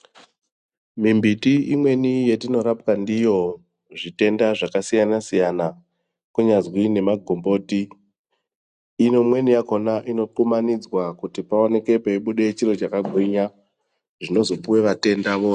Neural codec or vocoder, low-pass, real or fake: none; 10.8 kHz; real